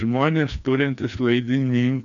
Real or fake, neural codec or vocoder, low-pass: fake; codec, 16 kHz, 2 kbps, FreqCodec, larger model; 7.2 kHz